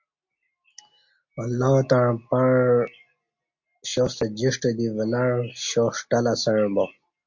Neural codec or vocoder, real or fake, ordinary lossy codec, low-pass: none; real; MP3, 48 kbps; 7.2 kHz